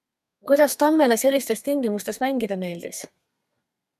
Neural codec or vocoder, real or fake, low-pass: codec, 32 kHz, 1.9 kbps, SNAC; fake; 14.4 kHz